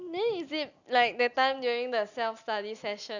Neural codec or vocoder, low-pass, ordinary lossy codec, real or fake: none; 7.2 kHz; none; real